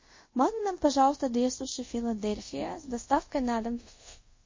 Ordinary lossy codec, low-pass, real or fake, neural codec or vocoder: MP3, 32 kbps; 7.2 kHz; fake; codec, 24 kHz, 0.5 kbps, DualCodec